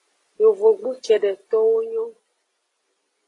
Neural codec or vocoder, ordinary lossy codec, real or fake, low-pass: none; AAC, 32 kbps; real; 10.8 kHz